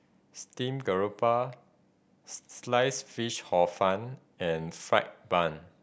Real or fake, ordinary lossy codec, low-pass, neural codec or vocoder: real; none; none; none